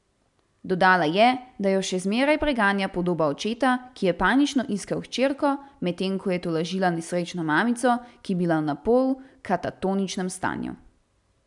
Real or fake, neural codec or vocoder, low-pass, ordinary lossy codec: real; none; 10.8 kHz; none